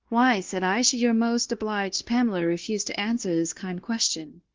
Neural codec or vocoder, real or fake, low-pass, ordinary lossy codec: codec, 16 kHz, 0.9 kbps, LongCat-Audio-Codec; fake; 7.2 kHz; Opus, 16 kbps